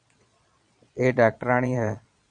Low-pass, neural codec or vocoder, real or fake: 9.9 kHz; vocoder, 22.05 kHz, 80 mel bands, Vocos; fake